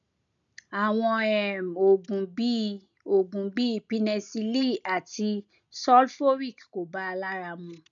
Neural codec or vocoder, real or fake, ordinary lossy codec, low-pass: none; real; none; 7.2 kHz